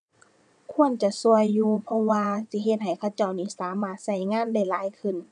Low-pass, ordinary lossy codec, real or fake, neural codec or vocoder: 9.9 kHz; none; fake; vocoder, 22.05 kHz, 80 mel bands, WaveNeXt